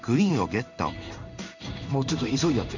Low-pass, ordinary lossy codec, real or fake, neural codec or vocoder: 7.2 kHz; none; fake; codec, 16 kHz in and 24 kHz out, 1 kbps, XY-Tokenizer